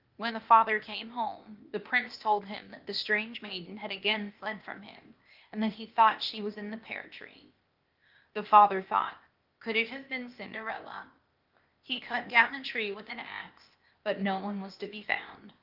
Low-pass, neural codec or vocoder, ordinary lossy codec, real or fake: 5.4 kHz; codec, 16 kHz, 0.8 kbps, ZipCodec; Opus, 24 kbps; fake